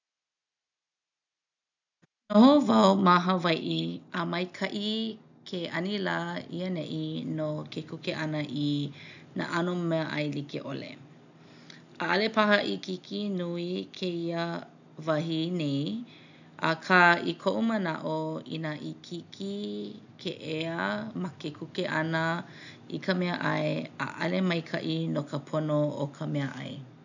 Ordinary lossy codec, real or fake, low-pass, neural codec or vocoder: none; real; 7.2 kHz; none